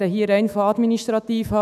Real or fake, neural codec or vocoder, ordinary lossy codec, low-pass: fake; autoencoder, 48 kHz, 128 numbers a frame, DAC-VAE, trained on Japanese speech; none; 14.4 kHz